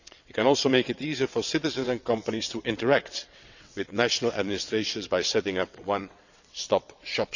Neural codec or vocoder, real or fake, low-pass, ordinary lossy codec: vocoder, 22.05 kHz, 80 mel bands, WaveNeXt; fake; 7.2 kHz; none